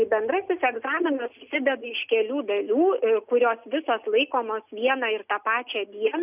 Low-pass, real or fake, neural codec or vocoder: 3.6 kHz; real; none